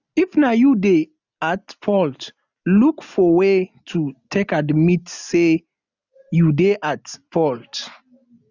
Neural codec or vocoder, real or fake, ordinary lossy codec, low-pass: none; real; none; 7.2 kHz